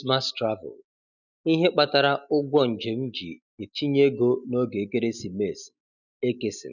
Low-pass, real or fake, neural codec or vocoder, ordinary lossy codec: 7.2 kHz; real; none; none